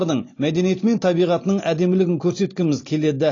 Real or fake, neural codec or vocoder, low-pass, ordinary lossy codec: real; none; 7.2 kHz; AAC, 32 kbps